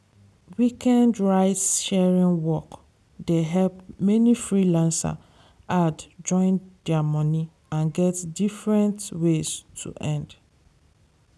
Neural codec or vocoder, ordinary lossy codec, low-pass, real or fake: none; none; none; real